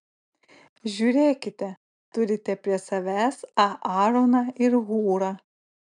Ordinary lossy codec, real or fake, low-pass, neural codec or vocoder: MP3, 96 kbps; real; 9.9 kHz; none